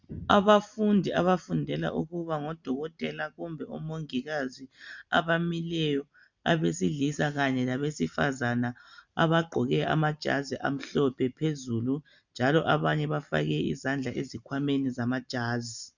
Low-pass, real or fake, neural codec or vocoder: 7.2 kHz; real; none